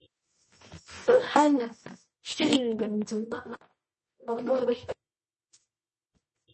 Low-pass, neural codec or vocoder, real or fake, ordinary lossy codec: 10.8 kHz; codec, 24 kHz, 0.9 kbps, WavTokenizer, medium music audio release; fake; MP3, 32 kbps